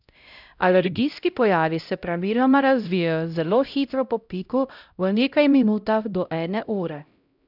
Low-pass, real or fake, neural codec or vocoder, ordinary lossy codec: 5.4 kHz; fake; codec, 16 kHz, 0.5 kbps, X-Codec, HuBERT features, trained on LibriSpeech; none